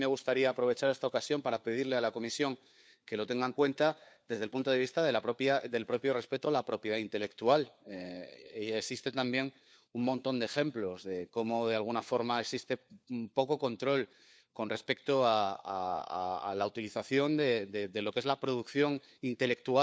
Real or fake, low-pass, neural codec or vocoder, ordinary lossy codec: fake; none; codec, 16 kHz, 4 kbps, FunCodec, trained on LibriTTS, 50 frames a second; none